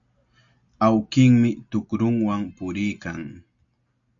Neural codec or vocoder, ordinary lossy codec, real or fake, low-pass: none; MP3, 64 kbps; real; 7.2 kHz